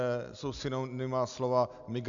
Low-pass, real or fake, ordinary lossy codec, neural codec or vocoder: 7.2 kHz; real; MP3, 96 kbps; none